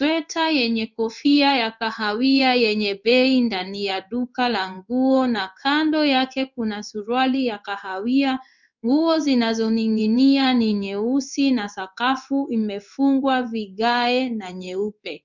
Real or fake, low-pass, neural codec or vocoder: fake; 7.2 kHz; codec, 16 kHz in and 24 kHz out, 1 kbps, XY-Tokenizer